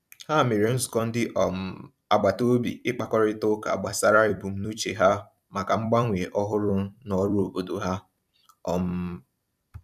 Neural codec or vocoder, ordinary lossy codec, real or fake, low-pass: vocoder, 44.1 kHz, 128 mel bands every 256 samples, BigVGAN v2; none; fake; 14.4 kHz